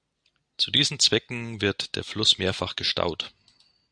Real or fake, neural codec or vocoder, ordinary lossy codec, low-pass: real; none; Opus, 64 kbps; 9.9 kHz